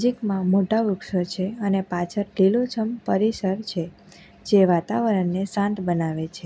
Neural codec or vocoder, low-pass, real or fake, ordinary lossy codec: none; none; real; none